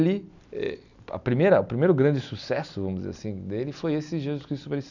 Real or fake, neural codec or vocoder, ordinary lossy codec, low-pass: real; none; none; 7.2 kHz